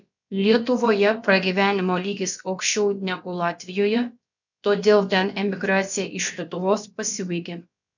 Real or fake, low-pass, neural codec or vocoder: fake; 7.2 kHz; codec, 16 kHz, about 1 kbps, DyCAST, with the encoder's durations